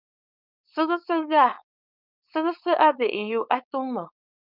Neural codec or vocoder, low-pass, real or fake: codec, 16 kHz, 4.8 kbps, FACodec; 5.4 kHz; fake